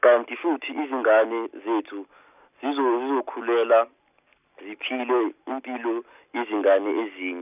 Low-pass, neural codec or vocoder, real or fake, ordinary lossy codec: 3.6 kHz; none; real; none